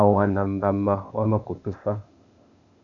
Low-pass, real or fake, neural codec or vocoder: 7.2 kHz; fake; codec, 16 kHz, 0.8 kbps, ZipCodec